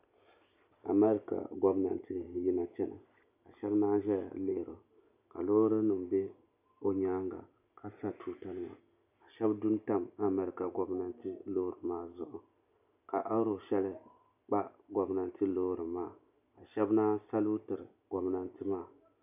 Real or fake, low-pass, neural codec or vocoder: real; 3.6 kHz; none